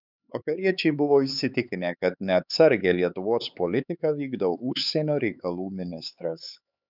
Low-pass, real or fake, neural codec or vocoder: 5.4 kHz; fake; codec, 16 kHz, 4 kbps, X-Codec, HuBERT features, trained on LibriSpeech